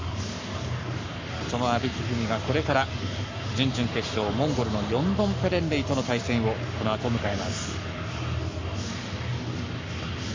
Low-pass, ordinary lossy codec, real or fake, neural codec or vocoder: 7.2 kHz; MP3, 64 kbps; fake; codec, 44.1 kHz, 7.8 kbps, Pupu-Codec